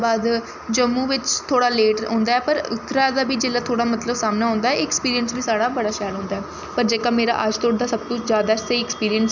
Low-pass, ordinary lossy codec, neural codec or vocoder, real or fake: 7.2 kHz; none; none; real